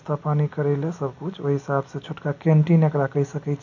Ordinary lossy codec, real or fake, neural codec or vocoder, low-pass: none; real; none; 7.2 kHz